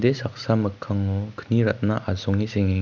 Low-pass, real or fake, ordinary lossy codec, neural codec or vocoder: 7.2 kHz; real; none; none